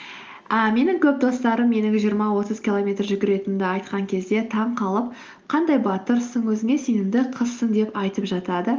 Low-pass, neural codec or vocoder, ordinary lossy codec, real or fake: 7.2 kHz; none; Opus, 24 kbps; real